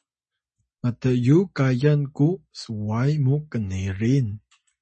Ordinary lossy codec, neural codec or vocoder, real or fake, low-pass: MP3, 32 kbps; none; real; 9.9 kHz